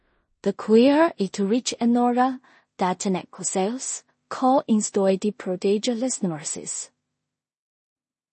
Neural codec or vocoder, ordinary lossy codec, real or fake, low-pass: codec, 16 kHz in and 24 kHz out, 0.4 kbps, LongCat-Audio-Codec, two codebook decoder; MP3, 32 kbps; fake; 10.8 kHz